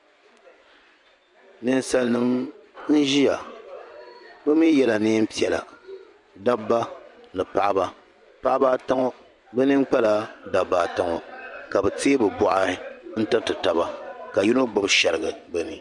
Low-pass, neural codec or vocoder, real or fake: 10.8 kHz; vocoder, 24 kHz, 100 mel bands, Vocos; fake